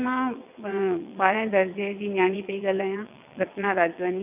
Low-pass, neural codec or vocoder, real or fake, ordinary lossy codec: 3.6 kHz; vocoder, 22.05 kHz, 80 mel bands, WaveNeXt; fake; none